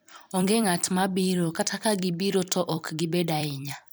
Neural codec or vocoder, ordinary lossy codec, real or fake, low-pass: vocoder, 44.1 kHz, 128 mel bands every 256 samples, BigVGAN v2; none; fake; none